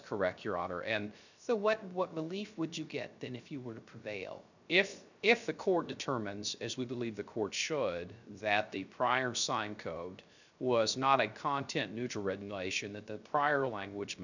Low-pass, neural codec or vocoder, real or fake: 7.2 kHz; codec, 16 kHz, 0.3 kbps, FocalCodec; fake